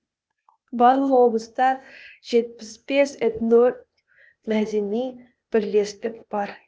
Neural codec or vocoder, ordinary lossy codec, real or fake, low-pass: codec, 16 kHz, 0.8 kbps, ZipCodec; none; fake; none